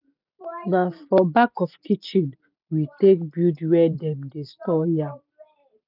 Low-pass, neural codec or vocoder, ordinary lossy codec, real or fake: 5.4 kHz; none; none; real